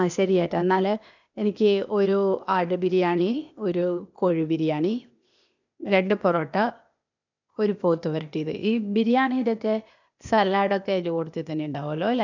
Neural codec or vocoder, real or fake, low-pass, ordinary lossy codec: codec, 16 kHz, 0.8 kbps, ZipCodec; fake; 7.2 kHz; none